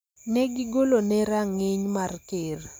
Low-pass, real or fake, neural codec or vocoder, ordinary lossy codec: none; real; none; none